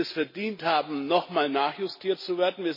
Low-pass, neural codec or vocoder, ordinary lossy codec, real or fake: 5.4 kHz; none; MP3, 24 kbps; real